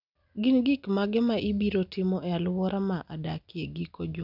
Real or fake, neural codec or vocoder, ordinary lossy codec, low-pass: real; none; none; 5.4 kHz